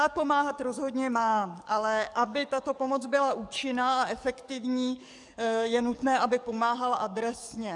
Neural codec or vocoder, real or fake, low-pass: codec, 44.1 kHz, 7.8 kbps, DAC; fake; 10.8 kHz